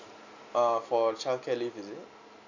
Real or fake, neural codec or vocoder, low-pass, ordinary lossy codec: real; none; 7.2 kHz; none